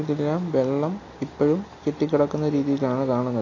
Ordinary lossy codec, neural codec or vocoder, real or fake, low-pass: AAC, 32 kbps; none; real; 7.2 kHz